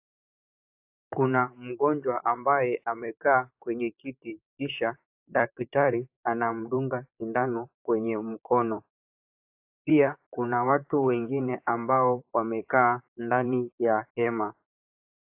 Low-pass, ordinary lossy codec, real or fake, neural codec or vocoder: 3.6 kHz; AAC, 32 kbps; fake; vocoder, 44.1 kHz, 128 mel bands, Pupu-Vocoder